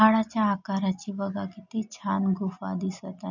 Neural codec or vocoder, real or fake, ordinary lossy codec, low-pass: none; real; none; 7.2 kHz